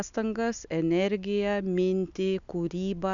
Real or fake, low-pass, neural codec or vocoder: real; 7.2 kHz; none